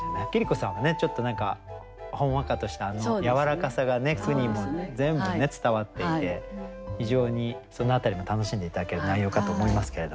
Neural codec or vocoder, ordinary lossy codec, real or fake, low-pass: none; none; real; none